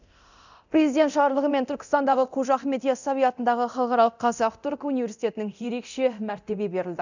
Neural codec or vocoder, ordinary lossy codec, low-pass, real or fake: codec, 24 kHz, 0.9 kbps, DualCodec; none; 7.2 kHz; fake